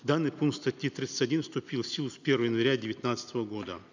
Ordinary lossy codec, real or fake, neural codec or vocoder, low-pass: none; real; none; 7.2 kHz